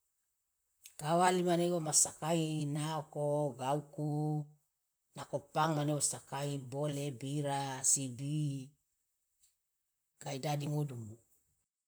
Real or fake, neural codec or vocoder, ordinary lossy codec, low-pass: fake; vocoder, 44.1 kHz, 128 mel bands, Pupu-Vocoder; none; none